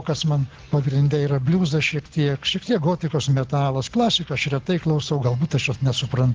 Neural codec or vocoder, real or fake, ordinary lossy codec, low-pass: none; real; Opus, 16 kbps; 7.2 kHz